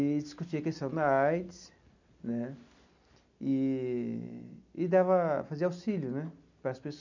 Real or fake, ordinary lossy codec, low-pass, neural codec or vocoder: real; none; 7.2 kHz; none